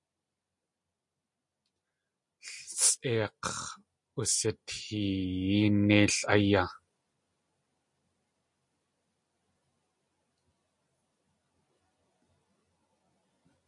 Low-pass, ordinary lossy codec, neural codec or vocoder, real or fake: 10.8 kHz; MP3, 48 kbps; none; real